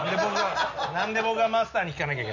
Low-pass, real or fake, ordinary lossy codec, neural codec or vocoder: 7.2 kHz; real; none; none